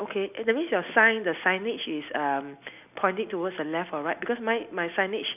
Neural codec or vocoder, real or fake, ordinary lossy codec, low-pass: none; real; none; 3.6 kHz